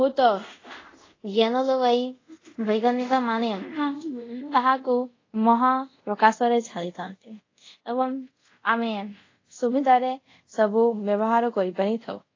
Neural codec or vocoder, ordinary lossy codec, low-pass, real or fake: codec, 24 kHz, 0.5 kbps, DualCodec; AAC, 32 kbps; 7.2 kHz; fake